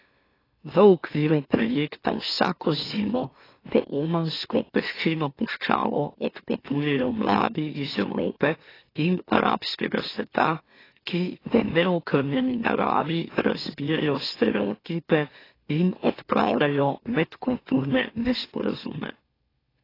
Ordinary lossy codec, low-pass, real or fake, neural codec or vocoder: AAC, 24 kbps; 5.4 kHz; fake; autoencoder, 44.1 kHz, a latent of 192 numbers a frame, MeloTTS